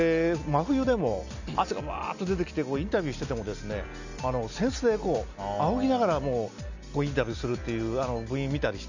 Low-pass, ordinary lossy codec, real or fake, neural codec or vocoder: 7.2 kHz; none; real; none